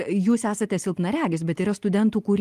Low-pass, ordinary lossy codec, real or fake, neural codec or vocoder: 14.4 kHz; Opus, 24 kbps; real; none